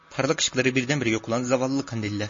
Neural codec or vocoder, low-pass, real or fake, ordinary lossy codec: none; 7.2 kHz; real; MP3, 32 kbps